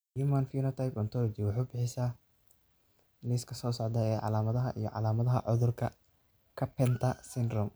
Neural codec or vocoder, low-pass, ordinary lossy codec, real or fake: none; none; none; real